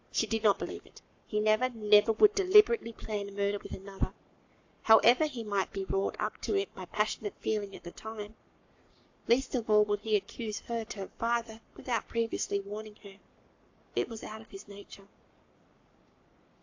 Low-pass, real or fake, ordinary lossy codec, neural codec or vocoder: 7.2 kHz; fake; AAC, 48 kbps; codec, 44.1 kHz, 7.8 kbps, Pupu-Codec